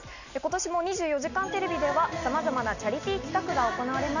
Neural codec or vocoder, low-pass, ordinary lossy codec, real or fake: none; 7.2 kHz; none; real